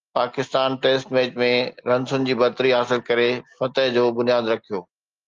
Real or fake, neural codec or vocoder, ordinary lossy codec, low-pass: real; none; Opus, 32 kbps; 7.2 kHz